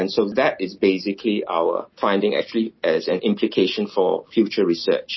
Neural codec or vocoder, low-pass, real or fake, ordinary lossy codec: none; 7.2 kHz; real; MP3, 24 kbps